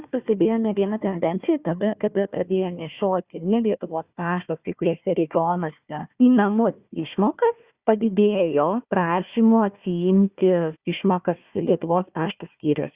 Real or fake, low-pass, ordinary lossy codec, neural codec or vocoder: fake; 3.6 kHz; Opus, 64 kbps; codec, 16 kHz, 1 kbps, FunCodec, trained on Chinese and English, 50 frames a second